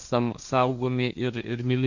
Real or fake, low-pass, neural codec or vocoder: fake; 7.2 kHz; codec, 16 kHz, 1.1 kbps, Voila-Tokenizer